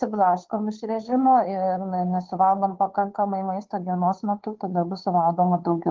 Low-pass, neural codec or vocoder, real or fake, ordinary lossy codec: 7.2 kHz; codec, 16 kHz, 2 kbps, FunCodec, trained on Chinese and English, 25 frames a second; fake; Opus, 16 kbps